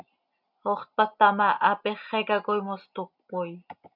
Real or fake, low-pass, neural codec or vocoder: real; 5.4 kHz; none